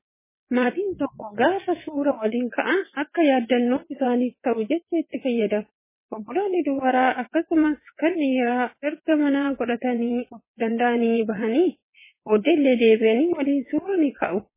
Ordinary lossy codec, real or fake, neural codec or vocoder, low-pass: MP3, 16 kbps; fake; vocoder, 22.05 kHz, 80 mel bands, WaveNeXt; 3.6 kHz